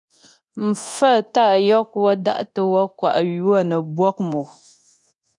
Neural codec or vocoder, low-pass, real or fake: codec, 24 kHz, 0.9 kbps, DualCodec; 10.8 kHz; fake